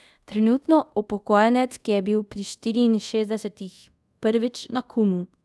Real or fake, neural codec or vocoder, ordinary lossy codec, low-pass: fake; codec, 24 kHz, 0.5 kbps, DualCodec; none; none